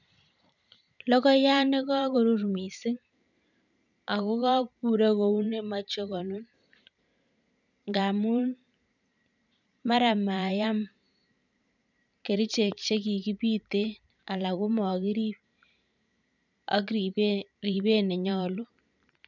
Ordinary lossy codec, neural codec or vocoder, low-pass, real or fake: none; vocoder, 44.1 kHz, 128 mel bands every 512 samples, BigVGAN v2; 7.2 kHz; fake